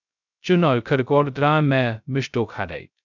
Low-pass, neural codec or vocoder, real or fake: 7.2 kHz; codec, 16 kHz, 0.2 kbps, FocalCodec; fake